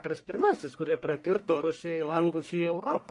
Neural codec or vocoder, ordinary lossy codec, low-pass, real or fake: codec, 44.1 kHz, 1.7 kbps, Pupu-Codec; MP3, 64 kbps; 10.8 kHz; fake